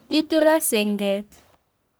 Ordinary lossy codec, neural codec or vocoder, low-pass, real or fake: none; codec, 44.1 kHz, 1.7 kbps, Pupu-Codec; none; fake